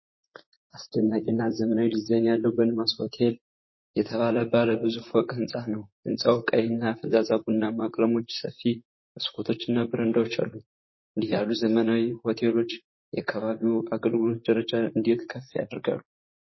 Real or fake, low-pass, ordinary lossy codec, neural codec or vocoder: fake; 7.2 kHz; MP3, 24 kbps; vocoder, 44.1 kHz, 128 mel bands, Pupu-Vocoder